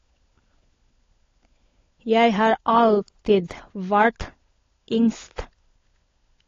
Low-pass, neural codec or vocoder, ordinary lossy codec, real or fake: 7.2 kHz; codec, 16 kHz, 16 kbps, FunCodec, trained on LibriTTS, 50 frames a second; AAC, 32 kbps; fake